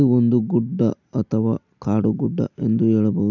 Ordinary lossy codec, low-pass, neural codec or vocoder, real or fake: none; 7.2 kHz; none; real